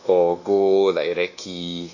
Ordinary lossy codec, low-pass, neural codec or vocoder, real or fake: none; 7.2 kHz; codec, 24 kHz, 1.2 kbps, DualCodec; fake